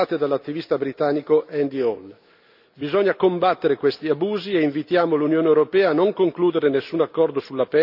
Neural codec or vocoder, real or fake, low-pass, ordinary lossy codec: none; real; 5.4 kHz; none